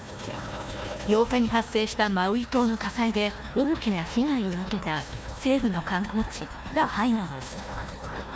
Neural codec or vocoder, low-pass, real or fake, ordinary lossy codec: codec, 16 kHz, 1 kbps, FunCodec, trained on Chinese and English, 50 frames a second; none; fake; none